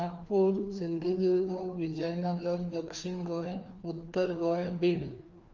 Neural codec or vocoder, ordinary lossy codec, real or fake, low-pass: codec, 16 kHz, 2 kbps, FreqCodec, larger model; Opus, 32 kbps; fake; 7.2 kHz